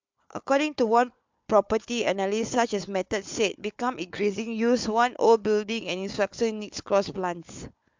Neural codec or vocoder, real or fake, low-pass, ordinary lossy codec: codec, 16 kHz, 4 kbps, FunCodec, trained on Chinese and English, 50 frames a second; fake; 7.2 kHz; MP3, 64 kbps